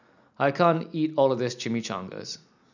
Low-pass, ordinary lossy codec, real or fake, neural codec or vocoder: 7.2 kHz; none; real; none